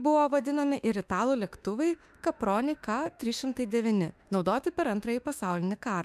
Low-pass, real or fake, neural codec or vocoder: 14.4 kHz; fake; autoencoder, 48 kHz, 32 numbers a frame, DAC-VAE, trained on Japanese speech